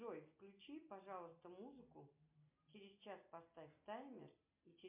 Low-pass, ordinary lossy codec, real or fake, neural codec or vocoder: 3.6 kHz; MP3, 32 kbps; real; none